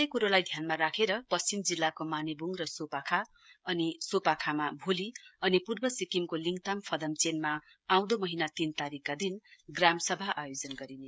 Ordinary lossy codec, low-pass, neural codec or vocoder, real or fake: none; none; codec, 16 kHz, 16 kbps, FreqCodec, smaller model; fake